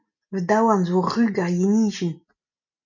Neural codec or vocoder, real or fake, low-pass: none; real; 7.2 kHz